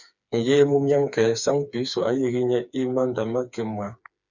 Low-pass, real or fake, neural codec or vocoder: 7.2 kHz; fake; codec, 16 kHz, 4 kbps, FreqCodec, smaller model